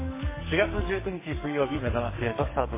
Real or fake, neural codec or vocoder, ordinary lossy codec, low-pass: fake; codec, 44.1 kHz, 2.6 kbps, SNAC; MP3, 16 kbps; 3.6 kHz